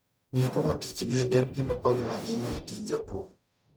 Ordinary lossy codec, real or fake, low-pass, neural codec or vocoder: none; fake; none; codec, 44.1 kHz, 0.9 kbps, DAC